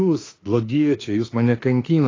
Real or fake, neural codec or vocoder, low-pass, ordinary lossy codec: fake; autoencoder, 48 kHz, 32 numbers a frame, DAC-VAE, trained on Japanese speech; 7.2 kHz; AAC, 32 kbps